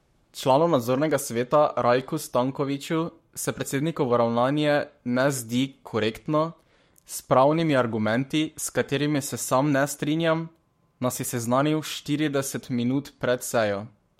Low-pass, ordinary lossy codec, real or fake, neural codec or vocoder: 19.8 kHz; MP3, 64 kbps; fake; codec, 44.1 kHz, 7.8 kbps, Pupu-Codec